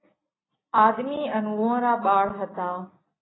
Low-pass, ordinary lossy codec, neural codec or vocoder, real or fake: 7.2 kHz; AAC, 16 kbps; none; real